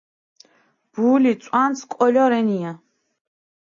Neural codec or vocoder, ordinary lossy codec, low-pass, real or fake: none; AAC, 48 kbps; 7.2 kHz; real